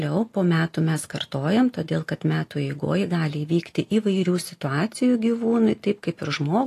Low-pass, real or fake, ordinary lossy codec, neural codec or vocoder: 14.4 kHz; real; AAC, 48 kbps; none